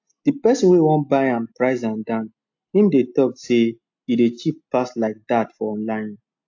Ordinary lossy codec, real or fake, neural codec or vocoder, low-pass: AAC, 48 kbps; real; none; 7.2 kHz